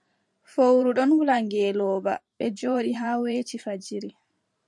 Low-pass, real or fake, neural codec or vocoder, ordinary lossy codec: 10.8 kHz; fake; vocoder, 44.1 kHz, 128 mel bands every 512 samples, BigVGAN v2; MP3, 64 kbps